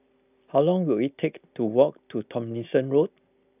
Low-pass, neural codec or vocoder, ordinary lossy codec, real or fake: 3.6 kHz; none; none; real